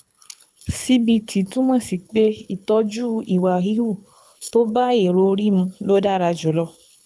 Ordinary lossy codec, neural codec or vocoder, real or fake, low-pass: none; codec, 24 kHz, 6 kbps, HILCodec; fake; none